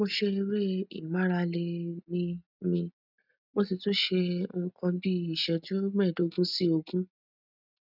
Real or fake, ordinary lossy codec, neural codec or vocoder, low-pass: real; none; none; 5.4 kHz